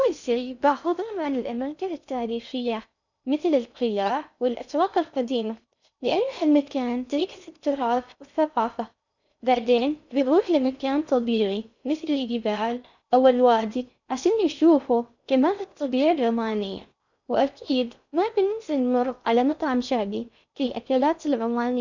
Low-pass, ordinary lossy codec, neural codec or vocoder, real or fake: 7.2 kHz; none; codec, 16 kHz in and 24 kHz out, 0.6 kbps, FocalCodec, streaming, 2048 codes; fake